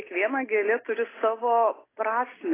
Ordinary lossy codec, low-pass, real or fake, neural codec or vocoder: AAC, 16 kbps; 3.6 kHz; real; none